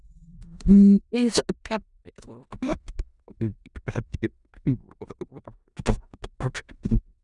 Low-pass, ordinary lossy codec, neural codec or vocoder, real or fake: 10.8 kHz; none; codec, 16 kHz in and 24 kHz out, 0.4 kbps, LongCat-Audio-Codec, four codebook decoder; fake